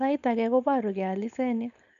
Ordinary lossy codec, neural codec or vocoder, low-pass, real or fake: none; codec, 16 kHz, 4.8 kbps, FACodec; 7.2 kHz; fake